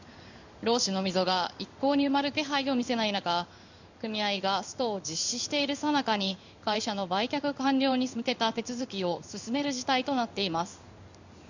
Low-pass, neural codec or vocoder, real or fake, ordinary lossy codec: 7.2 kHz; codec, 16 kHz in and 24 kHz out, 1 kbps, XY-Tokenizer; fake; none